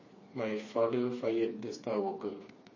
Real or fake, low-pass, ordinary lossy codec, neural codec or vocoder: fake; 7.2 kHz; MP3, 32 kbps; codec, 16 kHz, 4 kbps, FreqCodec, smaller model